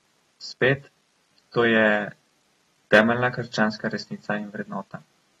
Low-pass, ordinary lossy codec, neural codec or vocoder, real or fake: 19.8 kHz; AAC, 32 kbps; none; real